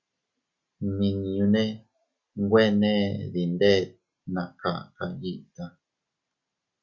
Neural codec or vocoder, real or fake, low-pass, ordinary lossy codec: none; real; 7.2 kHz; Opus, 64 kbps